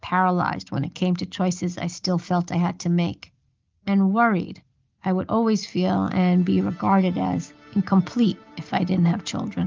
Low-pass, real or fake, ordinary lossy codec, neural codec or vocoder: 7.2 kHz; real; Opus, 24 kbps; none